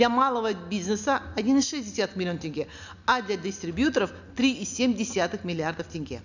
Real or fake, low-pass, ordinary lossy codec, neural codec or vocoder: real; 7.2 kHz; none; none